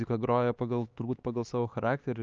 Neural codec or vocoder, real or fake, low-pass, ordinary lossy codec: codec, 16 kHz, 4 kbps, X-Codec, HuBERT features, trained on LibriSpeech; fake; 7.2 kHz; Opus, 24 kbps